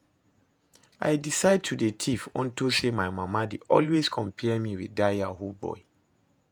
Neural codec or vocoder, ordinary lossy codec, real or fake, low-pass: vocoder, 48 kHz, 128 mel bands, Vocos; none; fake; none